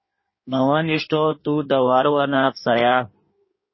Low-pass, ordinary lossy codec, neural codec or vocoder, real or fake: 7.2 kHz; MP3, 24 kbps; codec, 16 kHz in and 24 kHz out, 1.1 kbps, FireRedTTS-2 codec; fake